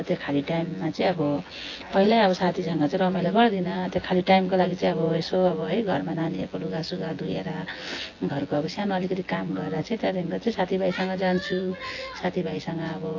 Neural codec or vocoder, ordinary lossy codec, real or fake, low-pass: vocoder, 24 kHz, 100 mel bands, Vocos; AAC, 32 kbps; fake; 7.2 kHz